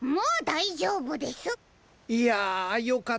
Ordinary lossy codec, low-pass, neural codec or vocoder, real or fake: none; none; none; real